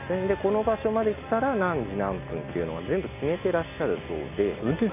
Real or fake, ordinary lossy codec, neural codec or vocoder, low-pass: real; none; none; 3.6 kHz